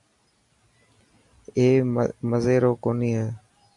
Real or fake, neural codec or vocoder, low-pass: real; none; 10.8 kHz